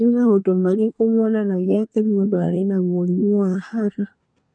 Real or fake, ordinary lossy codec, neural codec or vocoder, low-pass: fake; none; codec, 24 kHz, 1 kbps, SNAC; 9.9 kHz